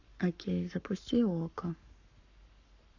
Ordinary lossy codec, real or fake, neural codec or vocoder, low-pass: none; fake; codec, 44.1 kHz, 7.8 kbps, Pupu-Codec; 7.2 kHz